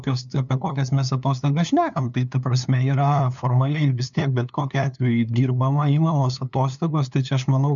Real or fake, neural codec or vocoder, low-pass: fake; codec, 16 kHz, 2 kbps, FunCodec, trained on LibriTTS, 25 frames a second; 7.2 kHz